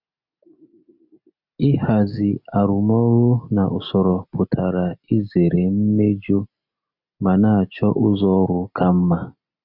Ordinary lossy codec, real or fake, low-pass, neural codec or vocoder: none; real; 5.4 kHz; none